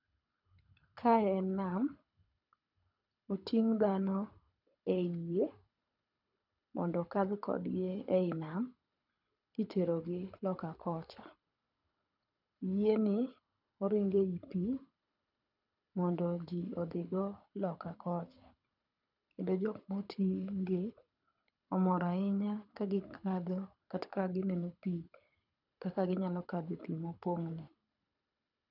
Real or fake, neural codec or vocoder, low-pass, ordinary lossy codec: fake; codec, 24 kHz, 6 kbps, HILCodec; 5.4 kHz; none